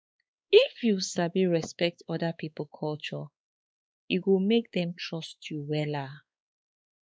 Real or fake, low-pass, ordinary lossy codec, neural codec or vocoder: fake; none; none; codec, 16 kHz, 4 kbps, X-Codec, WavLM features, trained on Multilingual LibriSpeech